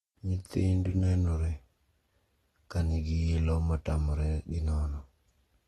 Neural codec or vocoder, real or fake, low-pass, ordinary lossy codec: none; real; 19.8 kHz; AAC, 32 kbps